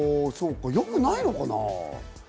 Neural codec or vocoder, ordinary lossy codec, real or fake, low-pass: none; none; real; none